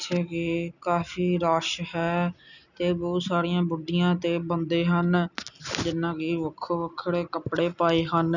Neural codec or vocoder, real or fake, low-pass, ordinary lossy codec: none; real; 7.2 kHz; none